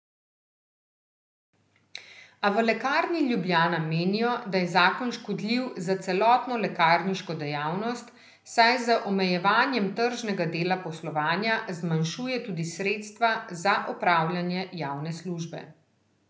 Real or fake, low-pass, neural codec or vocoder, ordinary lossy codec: real; none; none; none